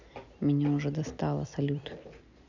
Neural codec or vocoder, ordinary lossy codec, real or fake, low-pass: none; none; real; 7.2 kHz